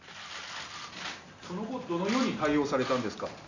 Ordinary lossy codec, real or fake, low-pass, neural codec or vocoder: none; real; 7.2 kHz; none